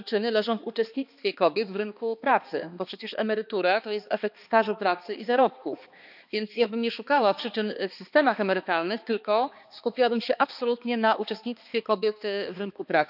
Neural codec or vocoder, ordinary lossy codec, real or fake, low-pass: codec, 16 kHz, 2 kbps, X-Codec, HuBERT features, trained on balanced general audio; none; fake; 5.4 kHz